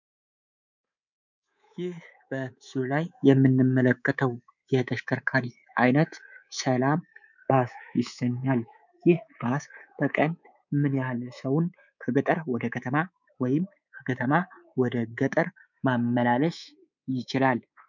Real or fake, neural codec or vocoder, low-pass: fake; codec, 24 kHz, 3.1 kbps, DualCodec; 7.2 kHz